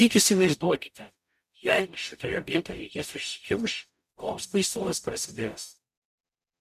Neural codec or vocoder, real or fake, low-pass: codec, 44.1 kHz, 0.9 kbps, DAC; fake; 14.4 kHz